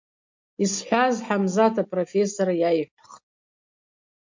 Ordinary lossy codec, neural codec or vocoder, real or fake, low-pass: MP3, 48 kbps; none; real; 7.2 kHz